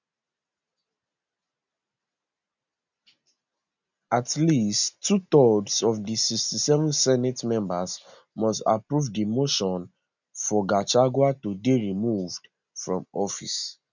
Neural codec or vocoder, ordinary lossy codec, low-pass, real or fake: none; none; 7.2 kHz; real